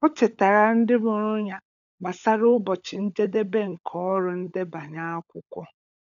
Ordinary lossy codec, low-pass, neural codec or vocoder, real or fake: none; 7.2 kHz; codec, 16 kHz, 16 kbps, FunCodec, trained on LibriTTS, 50 frames a second; fake